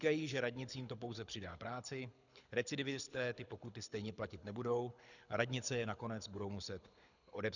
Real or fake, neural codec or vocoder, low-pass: fake; codec, 24 kHz, 6 kbps, HILCodec; 7.2 kHz